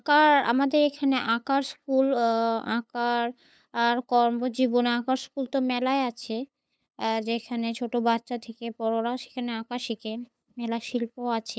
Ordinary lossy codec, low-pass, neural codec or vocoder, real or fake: none; none; codec, 16 kHz, 8 kbps, FunCodec, trained on LibriTTS, 25 frames a second; fake